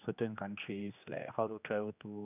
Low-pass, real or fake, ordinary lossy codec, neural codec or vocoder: 3.6 kHz; fake; none; codec, 16 kHz, 2 kbps, X-Codec, HuBERT features, trained on general audio